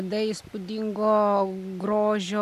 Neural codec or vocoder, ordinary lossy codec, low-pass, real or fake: none; MP3, 96 kbps; 14.4 kHz; real